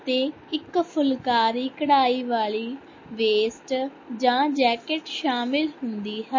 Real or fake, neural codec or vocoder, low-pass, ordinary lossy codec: real; none; 7.2 kHz; MP3, 32 kbps